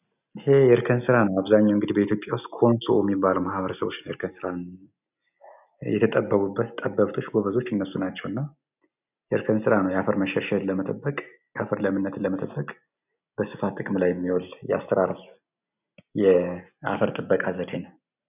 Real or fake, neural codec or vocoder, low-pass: real; none; 3.6 kHz